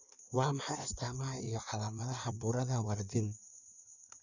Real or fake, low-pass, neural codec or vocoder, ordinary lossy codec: fake; 7.2 kHz; codec, 16 kHz in and 24 kHz out, 1.1 kbps, FireRedTTS-2 codec; none